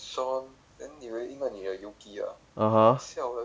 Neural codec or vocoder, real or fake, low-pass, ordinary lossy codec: none; real; none; none